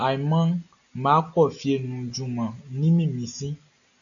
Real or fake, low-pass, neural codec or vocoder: real; 7.2 kHz; none